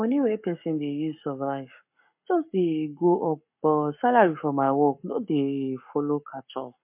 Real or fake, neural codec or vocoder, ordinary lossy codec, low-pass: fake; codec, 44.1 kHz, 7.8 kbps, Pupu-Codec; MP3, 32 kbps; 3.6 kHz